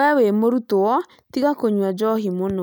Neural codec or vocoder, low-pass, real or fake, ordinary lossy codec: none; none; real; none